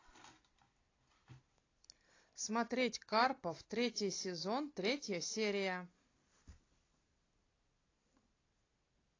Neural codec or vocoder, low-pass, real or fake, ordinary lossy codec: none; 7.2 kHz; real; AAC, 32 kbps